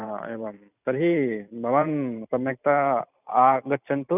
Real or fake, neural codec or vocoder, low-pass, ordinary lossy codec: fake; vocoder, 44.1 kHz, 128 mel bands every 512 samples, BigVGAN v2; 3.6 kHz; none